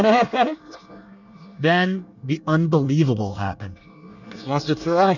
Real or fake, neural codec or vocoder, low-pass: fake; codec, 24 kHz, 1 kbps, SNAC; 7.2 kHz